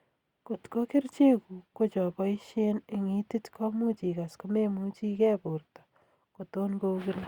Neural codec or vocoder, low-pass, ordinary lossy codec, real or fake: none; 19.8 kHz; Opus, 64 kbps; real